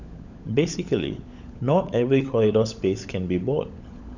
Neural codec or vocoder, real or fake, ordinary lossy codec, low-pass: codec, 16 kHz, 16 kbps, FunCodec, trained on LibriTTS, 50 frames a second; fake; none; 7.2 kHz